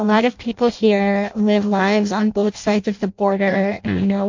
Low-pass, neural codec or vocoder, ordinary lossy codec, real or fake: 7.2 kHz; codec, 16 kHz in and 24 kHz out, 0.6 kbps, FireRedTTS-2 codec; MP3, 48 kbps; fake